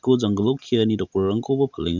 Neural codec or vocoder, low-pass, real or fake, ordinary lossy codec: none; 7.2 kHz; real; Opus, 64 kbps